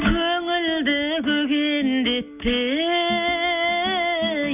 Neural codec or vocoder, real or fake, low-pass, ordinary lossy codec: none; real; 3.6 kHz; none